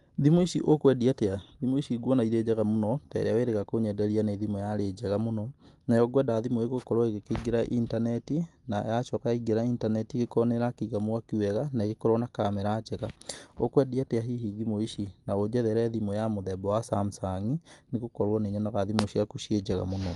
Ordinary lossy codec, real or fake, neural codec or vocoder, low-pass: Opus, 32 kbps; real; none; 10.8 kHz